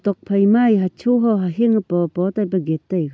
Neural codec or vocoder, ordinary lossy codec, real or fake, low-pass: none; none; real; none